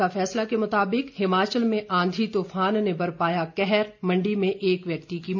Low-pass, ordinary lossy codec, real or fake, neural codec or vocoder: 7.2 kHz; MP3, 64 kbps; real; none